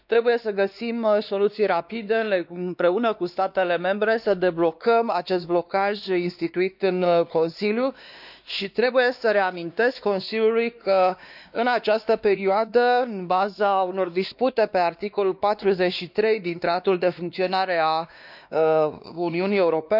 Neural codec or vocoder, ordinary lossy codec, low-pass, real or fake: codec, 16 kHz, 2 kbps, X-Codec, WavLM features, trained on Multilingual LibriSpeech; none; 5.4 kHz; fake